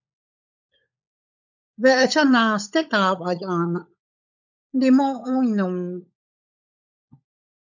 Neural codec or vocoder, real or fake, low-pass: codec, 16 kHz, 16 kbps, FunCodec, trained on LibriTTS, 50 frames a second; fake; 7.2 kHz